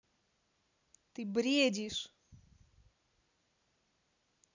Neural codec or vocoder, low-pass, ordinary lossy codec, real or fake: none; 7.2 kHz; none; real